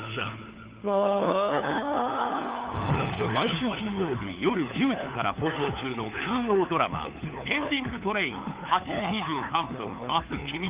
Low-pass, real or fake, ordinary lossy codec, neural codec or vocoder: 3.6 kHz; fake; Opus, 24 kbps; codec, 16 kHz, 4 kbps, FunCodec, trained on LibriTTS, 50 frames a second